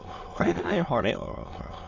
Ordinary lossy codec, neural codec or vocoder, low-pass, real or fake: AAC, 32 kbps; autoencoder, 22.05 kHz, a latent of 192 numbers a frame, VITS, trained on many speakers; 7.2 kHz; fake